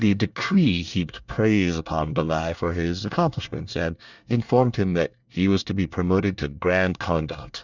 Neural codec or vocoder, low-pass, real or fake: codec, 24 kHz, 1 kbps, SNAC; 7.2 kHz; fake